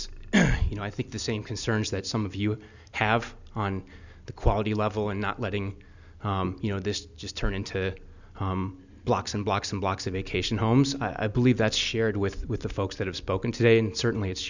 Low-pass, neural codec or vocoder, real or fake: 7.2 kHz; none; real